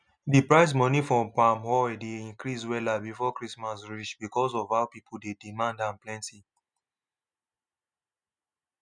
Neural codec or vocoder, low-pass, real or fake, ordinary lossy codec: none; 9.9 kHz; real; none